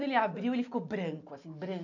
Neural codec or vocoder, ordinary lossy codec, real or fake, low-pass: none; none; real; 7.2 kHz